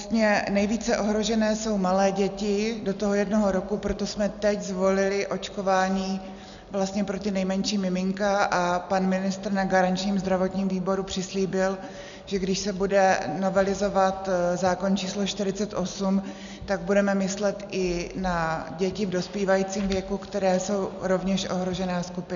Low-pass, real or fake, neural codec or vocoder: 7.2 kHz; real; none